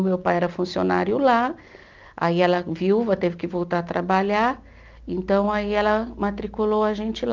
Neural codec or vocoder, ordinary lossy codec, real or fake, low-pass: none; Opus, 24 kbps; real; 7.2 kHz